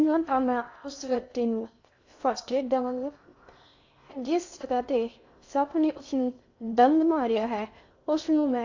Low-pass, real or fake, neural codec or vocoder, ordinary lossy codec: 7.2 kHz; fake; codec, 16 kHz in and 24 kHz out, 0.6 kbps, FocalCodec, streaming, 2048 codes; AAC, 48 kbps